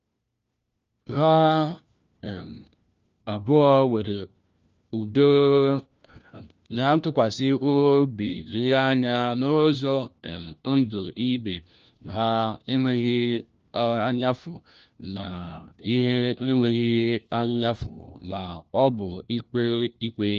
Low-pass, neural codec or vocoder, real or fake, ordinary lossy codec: 7.2 kHz; codec, 16 kHz, 1 kbps, FunCodec, trained on LibriTTS, 50 frames a second; fake; Opus, 24 kbps